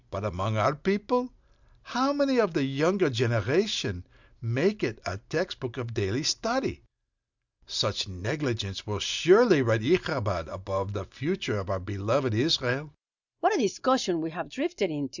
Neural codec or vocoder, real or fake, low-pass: none; real; 7.2 kHz